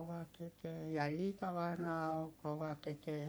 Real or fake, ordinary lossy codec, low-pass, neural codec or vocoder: fake; none; none; codec, 44.1 kHz, 3.4 kbps, Pupu-Codec